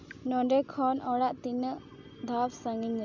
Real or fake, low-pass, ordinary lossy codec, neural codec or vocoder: real; 7.2 kHz; none; none